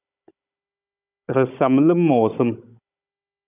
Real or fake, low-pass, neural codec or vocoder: fake; 3.6 kHz; codec, 16 kHz, 16 kbps, FunCodec, trained on Chinese and English, 50 frames a second